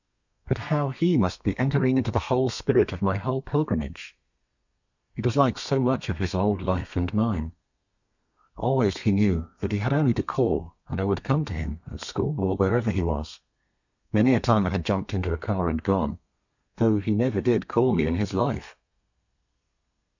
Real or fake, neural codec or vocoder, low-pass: fake; codec, 32 kHz, 1.9 kbps, SNAC; 7.2 kHz